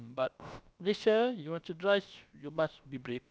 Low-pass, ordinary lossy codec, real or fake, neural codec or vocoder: none; none; fake; codec, 16 kHz, about 1 kbps, DyCAST, with the encoder's durations